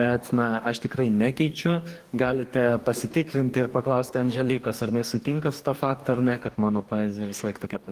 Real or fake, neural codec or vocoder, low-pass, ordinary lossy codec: fake; codec, 44.1 kHz, 2.6 kbps, DAC; 14.4 kHz; Opus, 24 kbps